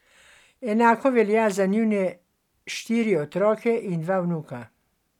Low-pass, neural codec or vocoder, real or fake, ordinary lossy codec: 19.8 kHz; none; real; none